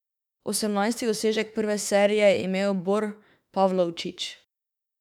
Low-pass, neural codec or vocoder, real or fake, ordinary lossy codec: 19.8 kHz; autoencoder, 48 kHz, 32 numbers a frame, DAC-VAE, trained on Japanese speech; fake; none